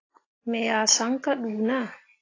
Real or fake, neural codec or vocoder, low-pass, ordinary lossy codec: real; none; 7.2 kHz; AAC, 32 kbps